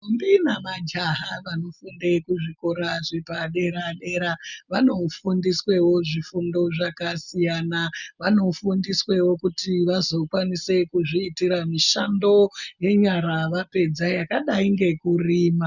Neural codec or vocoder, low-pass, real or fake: none; 7.2 kHz; real